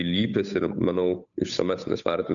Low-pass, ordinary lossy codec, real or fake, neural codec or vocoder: 7.2 kHz; MP3, 96 kbps; fake; codec, 16 kHz, 8 kbps, FunCodec, trained on Chinese and English, 25 frames a second